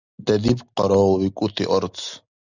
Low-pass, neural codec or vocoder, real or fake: 7.2 kHz; none; real